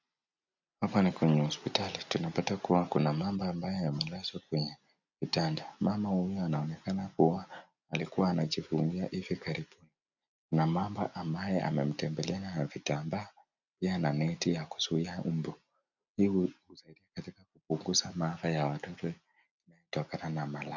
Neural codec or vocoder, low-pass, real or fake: none; 7.2 kHz; real